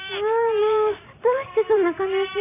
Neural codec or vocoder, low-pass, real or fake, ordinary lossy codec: vocoder, 44.1 kHz, 128 mel bands every 512 samples, BigVGAN v2; 3.6 kHz; fake; none